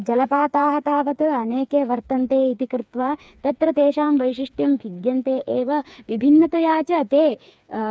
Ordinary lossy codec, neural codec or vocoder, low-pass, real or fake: none; codec, 16 kHz, 4 kbps, FreqCodec, smaller model; none; fake